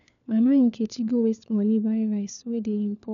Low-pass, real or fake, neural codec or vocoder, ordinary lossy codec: 7.2 kHz; fake; codec, 16 kHz, 4 kbps, FunCodec, trained on LibriTTS, 50 frames a second; none